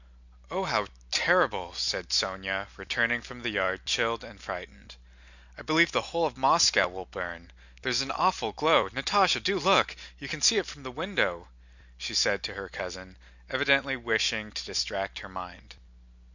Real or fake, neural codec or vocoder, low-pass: real; none; 7.2 kHz